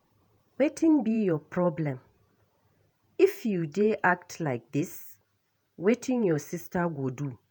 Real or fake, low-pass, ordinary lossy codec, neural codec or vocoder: fake; none; none; vocoder, 48 kHz, 128 mel bands, Vocos